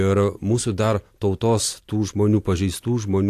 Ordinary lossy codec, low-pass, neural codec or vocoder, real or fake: AAC, 64 kbps; 14.4 kHz; none; real